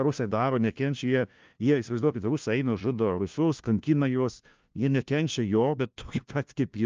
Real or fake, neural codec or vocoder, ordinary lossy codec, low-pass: fake; codec, 16 kHz, 1 kbps, FunCodec, trained on LibriTTS, 50 frames a second; Opus, 24 kbps; 7.2 kHz